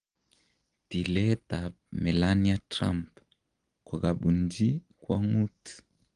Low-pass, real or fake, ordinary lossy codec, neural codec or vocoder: 9.9 kHz; real; Opus, 24 kbps; none